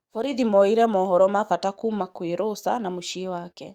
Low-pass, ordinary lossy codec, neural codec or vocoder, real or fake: 19.8 kHz; Opus, 64 kbps; codec, 44.1 kHz, 7.8 kbps, DAC; fake